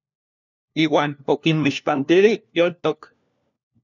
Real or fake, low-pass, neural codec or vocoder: fake; 7.2 kHz; codec, 16 kHz, 1 kbps, FunCodec, trained on LibriTTS, 50 frames a second